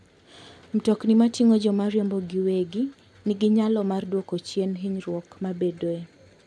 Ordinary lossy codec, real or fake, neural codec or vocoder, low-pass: none; real; none; none